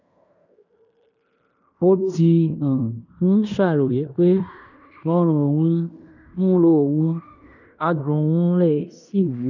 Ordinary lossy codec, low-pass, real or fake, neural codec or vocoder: none; 7.2 kHz; fake; codec, 16 kHz in and 24 kHz out, 0.9 kbps, LongCat-Audio-Codec, fine tuned four codebook decoder